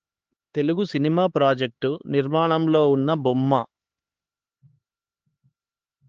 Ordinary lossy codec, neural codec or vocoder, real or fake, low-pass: Opus, 16 kbps; codec, 16 kHz, 4 kbps, X-Codec, HuBERT features, trained on LibriSpeech; fake; 7.2 kHz